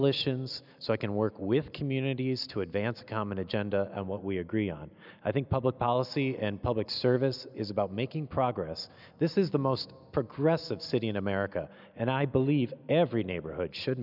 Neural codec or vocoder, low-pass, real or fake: none; 5.4 kHz; real